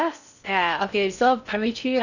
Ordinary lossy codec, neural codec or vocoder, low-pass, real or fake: none; codec, 16 kHz in and 24 kHz out, 0.6 kbps, FocalCodec, streaming, 4096 codes; 7.2 kHz; fake